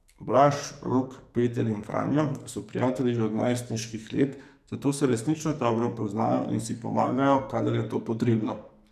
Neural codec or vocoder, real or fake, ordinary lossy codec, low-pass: codec, 44.1 kHz, 2.6 kbps, SNAC; fake; none; 14.4 kHz